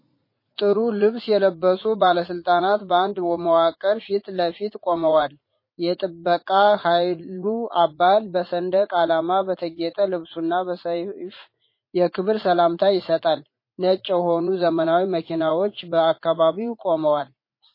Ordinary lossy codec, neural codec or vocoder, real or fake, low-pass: MP3, 24 kbps; vocoder, 44.1 kHz, 80 mel bands, Vocos; fake; 5.4 kHz